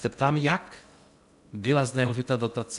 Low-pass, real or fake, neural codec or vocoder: 10.8 kHz; fake; codec, 16 kHz in and 24 kHz out, 0.6 kbps, FocalCodec, streaming, 2048 codes